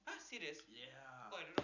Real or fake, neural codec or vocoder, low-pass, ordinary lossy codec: real; none; 7.2 kHz; none